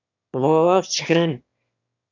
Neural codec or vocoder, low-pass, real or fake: autoencoder, 22.05 kHz, a latent of 192 numbers a frame, VITS, trained on one speaker; 7.2 kHz; fake